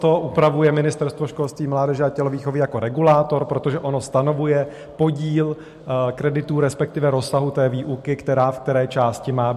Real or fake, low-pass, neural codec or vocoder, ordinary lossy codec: real; 14.4 kHz; none; MP3, 64 kbps